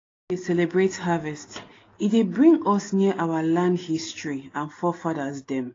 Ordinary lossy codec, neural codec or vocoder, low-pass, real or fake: AAC, 32 kbps; none; 7.2 kHz; real